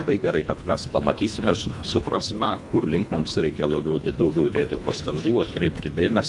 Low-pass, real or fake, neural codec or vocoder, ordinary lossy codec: 10.8 kHz; fake; codec, 24 kHz, 1.5 kbps, HILCodec; MP3, 64 kbps